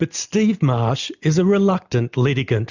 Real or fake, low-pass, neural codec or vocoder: real; 7.2 kHz; none